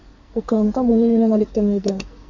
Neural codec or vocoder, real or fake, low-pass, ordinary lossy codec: codec, 44.1 kHz, 2.6 kbps, SNAC; fake; 7.2 kHz; Opus, 64 kbps